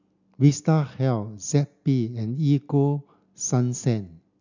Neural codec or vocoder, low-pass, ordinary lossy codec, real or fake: none; 7.2 kHz; none; real